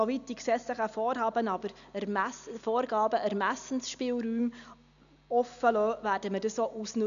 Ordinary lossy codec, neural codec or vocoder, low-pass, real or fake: none; none; 7.2 kHz; real